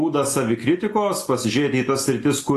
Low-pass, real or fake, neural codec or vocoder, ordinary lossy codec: 14.4 kHz; real; none; AAC, 48 kbps